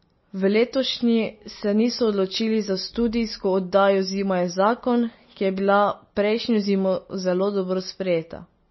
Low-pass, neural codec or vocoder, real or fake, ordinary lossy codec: 7.2 kHz; none; real; MP3, 24 kbps